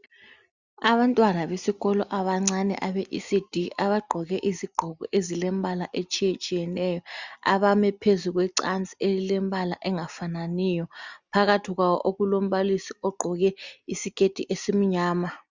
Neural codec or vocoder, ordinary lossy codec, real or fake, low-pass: none; Opus, 64 kbps; real; 7.2 kHz